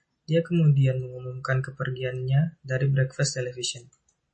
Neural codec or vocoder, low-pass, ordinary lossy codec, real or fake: none; 10.8 kHz; MP3, 32 kbps; real